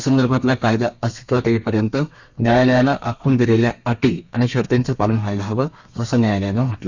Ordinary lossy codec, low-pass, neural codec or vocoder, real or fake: Opus, 64 kbps; 7.2 kHz; codec, 32 kHz, 1.9 kbps, SNAC; fake